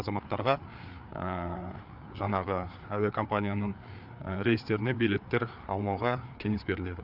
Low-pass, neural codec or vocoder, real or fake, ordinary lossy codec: 5.4 kHz; codec, 16 kHz, 4 kbps, FreqCodec, larger model; fake; none